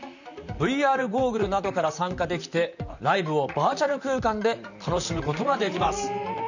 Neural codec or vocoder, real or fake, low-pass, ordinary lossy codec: vocoder, 44.1 kHz, 128 mel bands, Pupu-Vocoder; fake; 7.2 kHz; none